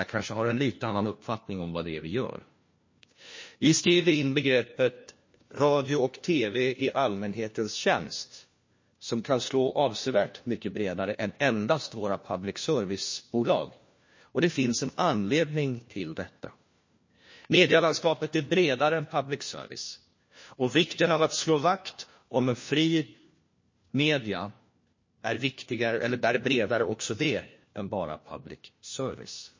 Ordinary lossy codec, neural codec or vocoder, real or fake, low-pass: MP3, 32 kbps; codec, 16 kHz, 1 kbps, FunCodec, trained on LibriTTS, 50 frames a second; fake; 7.2 kHz